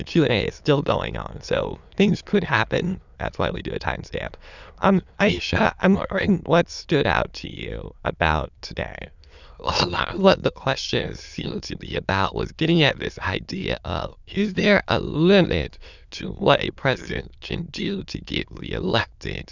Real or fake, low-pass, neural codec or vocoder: fake; 7.2 kHz; autoencoder, 22.05 kHz, a latent of 192 numbers a frame, VITS, trained on many speakers